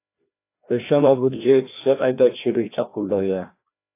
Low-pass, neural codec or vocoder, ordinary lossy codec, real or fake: 3.6 kHz; codec, 16 kHz, 1 kbps, FreqCodec, larger model; AAC, 24 kbps; fake